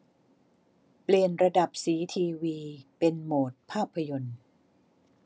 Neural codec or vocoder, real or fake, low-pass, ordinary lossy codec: none; real; none; none